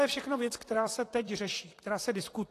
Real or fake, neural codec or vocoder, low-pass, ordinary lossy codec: fake; vocoder, 44.1 kHz, 128 mel bands, Pupu-Vocoder; 14.4 kHz; AAC, 48 kbps